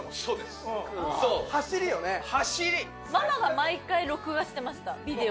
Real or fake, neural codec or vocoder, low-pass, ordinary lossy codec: real; none; none; none